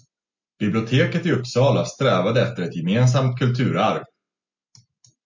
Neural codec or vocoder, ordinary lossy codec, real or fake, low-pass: none; MP3, 64 kbps; real; 7.2 kHz